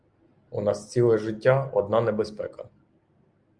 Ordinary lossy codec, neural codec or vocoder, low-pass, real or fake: Opus, 32 kbps; none; 9.9 kHz; real